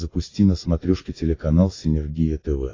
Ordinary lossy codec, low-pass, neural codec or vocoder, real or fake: AAC, 32 kbps; 7.2 kHz; none; real